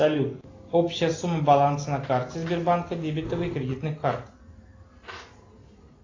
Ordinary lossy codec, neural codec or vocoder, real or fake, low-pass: AAC, 32 kbps; none; real; 7.2 kHz